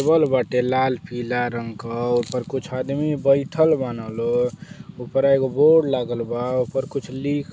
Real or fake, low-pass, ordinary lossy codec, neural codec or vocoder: real; none; none; none